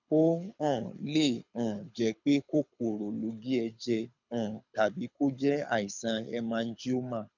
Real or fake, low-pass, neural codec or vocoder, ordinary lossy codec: fake; 7.2 kHz; codec, 24 kHz, 6 kbps, HILCodec; none